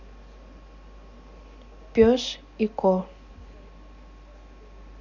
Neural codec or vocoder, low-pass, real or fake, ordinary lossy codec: none; 7.2 kHz; real; none